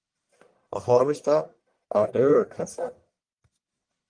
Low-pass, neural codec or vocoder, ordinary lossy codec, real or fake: 9.9 kHz; codec, 44.1 kHz, 1.7 kbps, Pupu-Codec; Opus, 32 kbps; fake